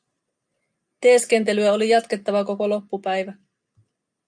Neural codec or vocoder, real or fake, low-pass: none; real; 9.9 kHz